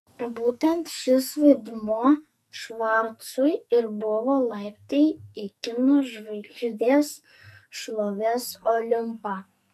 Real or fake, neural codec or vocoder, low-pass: fake; codec, 44.1 kHz, 2.6 kbps, SNAC; 14.4 kHz